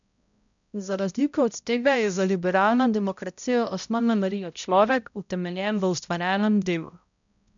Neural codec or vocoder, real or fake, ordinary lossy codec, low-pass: codec, 16 kHz, 0.5 kbps, X-Codec, HuBERT features, trained on balanced general audio; fake; MP3, 96 kbps; 7.2 kHz